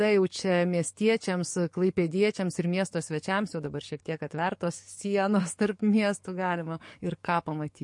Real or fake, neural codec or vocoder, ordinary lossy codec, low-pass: fake; codec, 44.1 kHz, 7.8 kbps, DAC; MP3, 48 kbps; 10.8 kHz